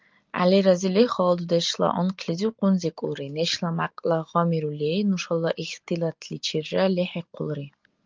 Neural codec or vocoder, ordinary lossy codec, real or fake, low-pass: none; Opus, 24 kbps; real; 7.2 kHz